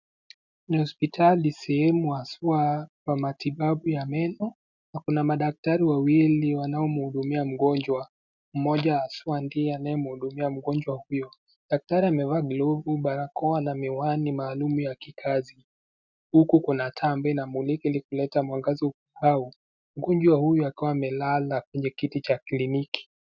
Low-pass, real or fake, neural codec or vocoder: 7.2 kHz; real; none